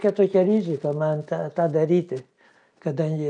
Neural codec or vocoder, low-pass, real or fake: none; 9.9 kHz; real